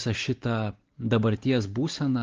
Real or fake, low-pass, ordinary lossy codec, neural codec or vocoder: real; 7.2 kHz; Opus, 16 kbps; none